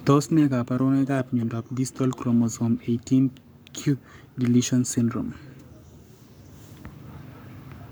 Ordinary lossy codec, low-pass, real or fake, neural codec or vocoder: none; none; fake; codec, 44.1 kHz, 7.8 kbps, Pupu-Codec